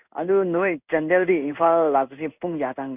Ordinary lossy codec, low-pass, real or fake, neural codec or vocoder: none; 3.6 kHz; fake; codec, 16 kHz in and 24 kHz out, 1 kbps, XY-Tokenizer